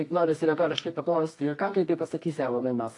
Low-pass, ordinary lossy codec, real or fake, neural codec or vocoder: 10.8 kHz; AAC, 48 kbps; fake; codec, 24 kHz, 0.9 kbps, WavTokenizer, medium music audio release